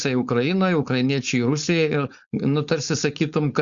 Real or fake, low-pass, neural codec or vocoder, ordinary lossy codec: fake; 7.2 kHz; codec, 16 kHz, 4.8 kbps, FACodec; Opus, 64 kbps